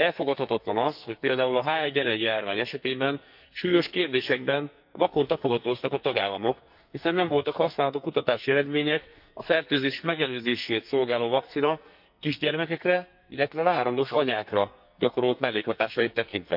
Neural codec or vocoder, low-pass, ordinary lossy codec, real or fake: codec, 44.1 kHz, 2.6 kbps, SNAC; 5.4 kHz; none; fake